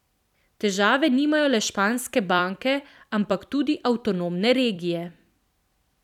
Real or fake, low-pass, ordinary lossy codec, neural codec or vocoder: fake; 19.8 kHz; none; vocoder, 44.1 kHz, 128 mel bands every 256 samples, BigVGAN v2